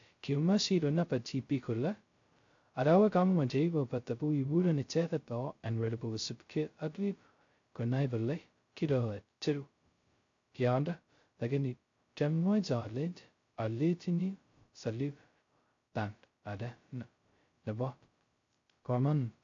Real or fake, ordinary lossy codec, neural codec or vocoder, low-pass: fake; AAC, 48 kbps; codec, 16 kHz, 0.2 kbps, FocalCodec; 7.2 kHz